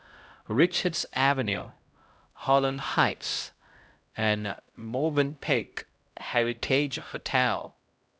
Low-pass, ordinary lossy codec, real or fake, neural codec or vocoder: none; none; fake; codec, 16 kHz, 0.5 kbps, X-Codec, HuBERT features, trained on LibriSpeech